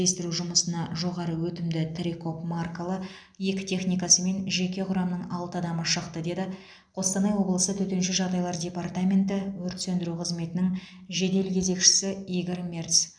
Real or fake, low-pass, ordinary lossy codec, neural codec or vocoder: real; 9.9 kHz; none; none